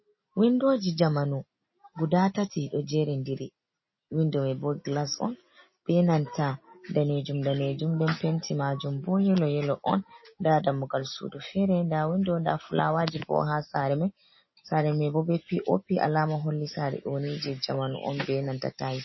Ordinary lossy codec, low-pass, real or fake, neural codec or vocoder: MP3, 24 kbps; 7.2 kHz; real; none